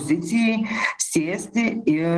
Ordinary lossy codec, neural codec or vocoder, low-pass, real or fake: Opus, 16 kbps; none; 9.9 kHz; real